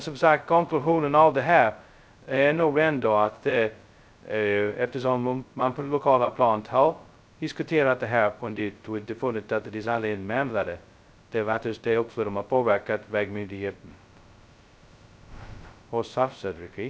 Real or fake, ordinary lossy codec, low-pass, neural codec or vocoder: fake; none; none; codec, 16 kHz, 0.2 kbps, FocalCodec